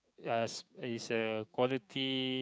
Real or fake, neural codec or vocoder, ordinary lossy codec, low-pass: fake; codec, 16 kHz, 6 kbps, DAC; none; none